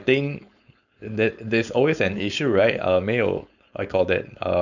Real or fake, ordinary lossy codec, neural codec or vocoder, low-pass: fake; none; codec, 16 kHz, 4.8 kbps, FACodec; 7.2 kHz